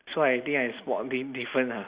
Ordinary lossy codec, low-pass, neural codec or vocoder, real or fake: Opus, 64 kbps; 3.6 kHz; none; real